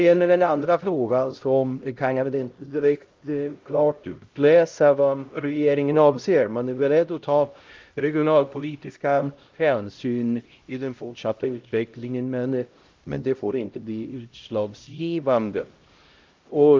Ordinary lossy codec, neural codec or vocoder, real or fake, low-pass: Opus, 24 kbps; codec, 16 kHz, 0.5 kbps, X-Codec, HuBERT features, trained on LibriSpeech; fake; 7.2 kHz